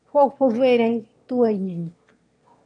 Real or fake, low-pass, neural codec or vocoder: fake; 9.9 kHz; autoencoder, 22.05 kHz, a latent of 192 numbers a frame, VITS, trained on one speaker